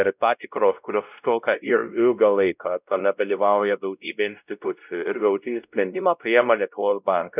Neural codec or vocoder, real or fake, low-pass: codec, 16 kHz, 0.5 kbps, X-Codec, WavLM features, trained on Multilingual LibriSpeech; fake; 3.6 kHz